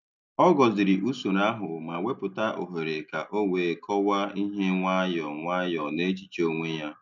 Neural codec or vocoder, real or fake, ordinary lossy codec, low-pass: none; real; none; 7.2 kHz